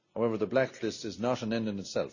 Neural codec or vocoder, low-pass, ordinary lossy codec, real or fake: none; 7.2 kHz; none; real